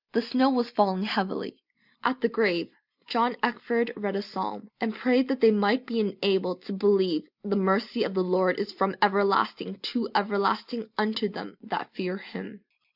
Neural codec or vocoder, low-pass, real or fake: none; 5.4 kHz; real